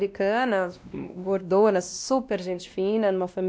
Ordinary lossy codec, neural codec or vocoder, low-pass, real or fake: none; codec, 16 kHz, 1 kbps, X-Codec, WavLM features, trained on Multilingual LibriSpeech; none; fake